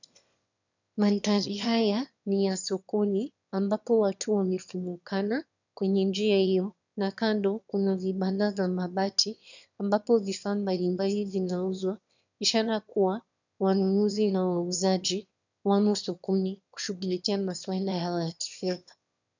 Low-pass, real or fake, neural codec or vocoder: 7.2 kHz; fake; autoencoder, 22.05 kHz, a latent of 192 numbers a frame, VITS, trained on one speaker